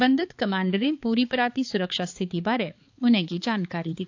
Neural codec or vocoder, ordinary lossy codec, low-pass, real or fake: codec, 16 kHz, 4 kbps, X-Codec, HuBERT features, trained on balanced general audio; AAC, 48 kbps; 7.2 kHz; fake